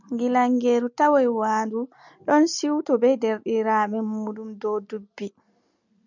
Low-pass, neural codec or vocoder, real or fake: 7.2 kHz; none; real